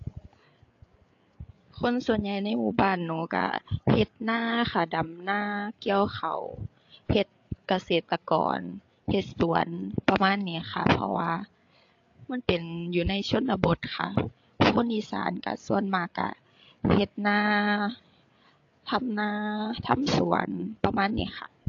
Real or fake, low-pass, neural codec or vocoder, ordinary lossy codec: fake; 7.2 kHz; codec, 16 kHz, 8 kbps, FreqCodec, larger model; AAC, 48 kbps